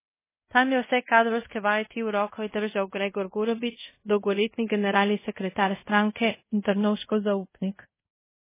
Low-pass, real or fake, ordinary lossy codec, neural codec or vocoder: 3.6 kHz; fake; MP3, 16 kbps; codec, 24 kHz, 0.5 kbps, DualCodec